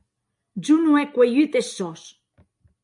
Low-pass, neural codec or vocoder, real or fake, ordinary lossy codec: 10.8 kHz; none; real; MP3, 48 kbps